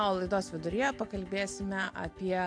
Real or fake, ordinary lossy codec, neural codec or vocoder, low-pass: real; MP3, 64 kbps; none; 9.9 kHz